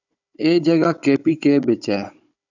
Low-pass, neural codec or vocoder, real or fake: 7.2 kHz; codec, 16 kHz, 16 kbps, FunCodec, trained on Chinese and English, 50 frames a second; fake